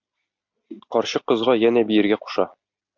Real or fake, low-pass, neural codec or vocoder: real; 7.2 kHz; none